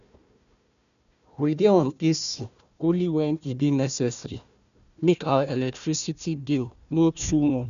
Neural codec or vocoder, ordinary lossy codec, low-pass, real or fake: codec, 16 kHz, 1 kbps, FunCodec, trained on Chinese and English, 50 frames a second; none; 7.2 kHz; fake